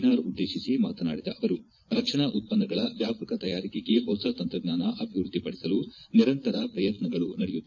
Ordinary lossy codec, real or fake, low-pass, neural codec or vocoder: none; fake; 7.2 kHz; vocoder, 22.05 kHz, 80 mel bands, Vocos